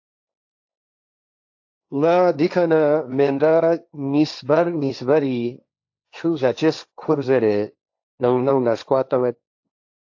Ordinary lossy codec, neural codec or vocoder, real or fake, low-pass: AAC, 48 kbps; codec, 16 kHz, 1.1 kbps, Voila-Tokenizer; fake; 7.2 kHz